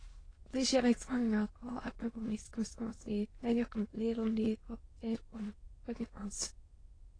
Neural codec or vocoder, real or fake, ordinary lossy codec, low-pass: autoencoder, 22.05 kHz, a latent of 192 numbers a frame, VITS, trained on many speakers; fake; AAC, 32 kbps; 9.9 kHz